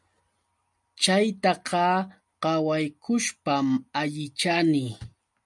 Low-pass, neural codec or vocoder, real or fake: 10.8 kHz; none; real